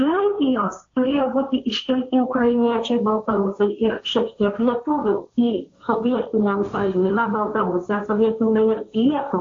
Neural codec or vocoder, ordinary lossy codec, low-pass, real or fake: codec, 16 kHz, 1.1 kbps, Voila-Tokenizer; AAC, 48 kbps; 7.2 kHz; fake